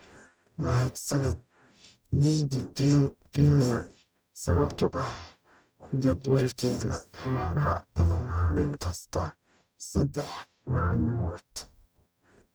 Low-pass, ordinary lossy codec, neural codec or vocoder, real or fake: none; none; codec, 44.1 kHz, 0.9 kbps, DAC; fake